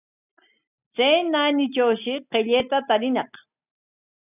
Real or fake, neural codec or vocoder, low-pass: real; none; 3.6 kHz